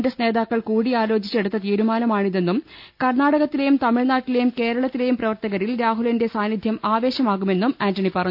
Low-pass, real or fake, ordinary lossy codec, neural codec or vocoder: 5.4 kHz; real; none; none